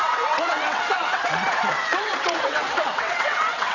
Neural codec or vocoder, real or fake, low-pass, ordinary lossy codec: vocoder, 22.05 kHz, 80 mel bands, WaveNeXt; fake; 7.2 kHz; none